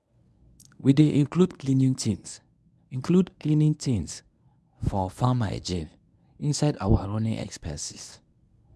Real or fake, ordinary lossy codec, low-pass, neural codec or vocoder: fake; none; none; codec, 24 kHz, 0.9 kbps, WavTokenizer, medium speech release version 1